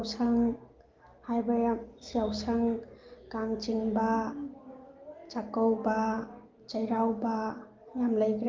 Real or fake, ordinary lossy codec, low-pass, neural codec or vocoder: real; Opus, 32 kbps; 7.2 kHz; none